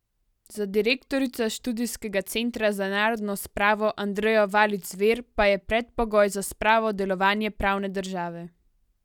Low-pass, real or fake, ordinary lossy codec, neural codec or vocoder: 19.8 kHz; real; none; none